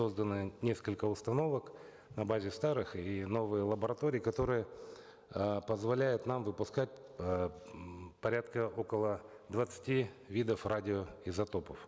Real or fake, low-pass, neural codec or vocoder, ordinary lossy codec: real; none; none; none